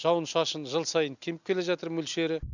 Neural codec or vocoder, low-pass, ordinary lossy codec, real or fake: none; 7.2 kHz; none; real